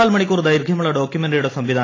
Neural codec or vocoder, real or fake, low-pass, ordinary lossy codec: none; real; 7.2 kHz; AAC, 32 kbps